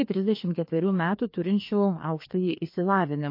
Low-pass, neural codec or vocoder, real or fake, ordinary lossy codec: 5.4 kHz; codec, 16 kHz, 2 kbps, FreqCodec, larger model; fake; MP3, 32 kbps